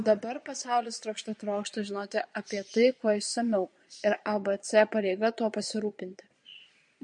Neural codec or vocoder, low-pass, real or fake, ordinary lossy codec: vocoder, 22.05 kHz, 80 mel bands, Vocos; 9.9 kHz; fake; MP3, 48 kbps